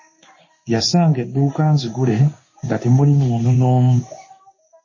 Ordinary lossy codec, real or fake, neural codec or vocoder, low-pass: MP3, 32 kbps; fake; codec, 16 kHz in and 24 kHz out, 1 kbps, XY-Tokenizer; 7.2 kHz